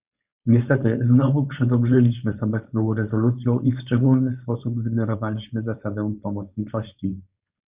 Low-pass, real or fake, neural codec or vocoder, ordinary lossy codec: 3.6 kHz; fake; codec, 16 kHz, 4.8 kbps, FACodec; Opus, 64 kbps